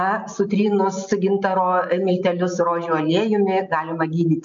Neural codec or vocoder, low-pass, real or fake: none; 7.2 kHz; real